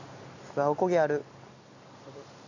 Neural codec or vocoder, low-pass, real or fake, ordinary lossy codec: none; 7.2 kHz; real; none